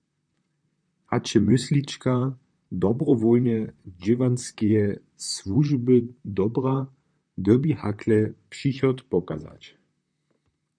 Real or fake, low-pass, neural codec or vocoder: fake; 9.9 kHz; vocoder, 44.1 kHz, 128 mel bands, Pupu-Vocoder